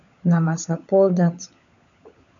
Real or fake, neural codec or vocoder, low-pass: fake; codec, 16 kHz, 16 kbps, FunCodec, trained on LibriTTS, 50 frames a second; 7.2 kHz